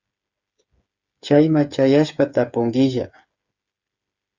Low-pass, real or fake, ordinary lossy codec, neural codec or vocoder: 7.2 kHz; fake; Opus, 64 kbps; codec, 16 kHz, 8 kbps, FreqCodec, smaller model